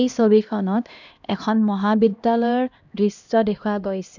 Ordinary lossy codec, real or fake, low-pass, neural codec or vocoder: none; fake; 7.2 kHz; codec, 16 kHz, 1 kbps, X-Codec, HuBERT features, trained on LibriSpeech